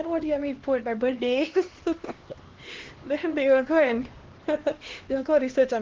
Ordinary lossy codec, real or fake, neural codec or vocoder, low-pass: Opus, 16 kbps; fake; codec, 16 kHz, 2 kbps, X-Codec, HuBERT features, trained on LibriSpeech; 7.2 kHz